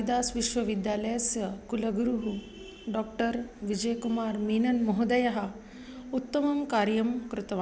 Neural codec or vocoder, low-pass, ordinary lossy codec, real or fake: none; none; none; real